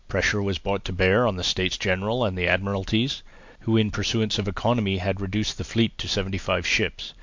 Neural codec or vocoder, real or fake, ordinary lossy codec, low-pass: none; real; MP3, 64 kbps; 7.2 kHz